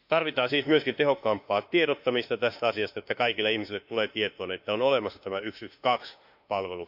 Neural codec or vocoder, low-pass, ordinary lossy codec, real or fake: autoencoder, 48 kHz, 32 numbers a frame, DAC-VAE, trained on Japanese speech; 5.4 kHz; MP3, 48 kbps; fake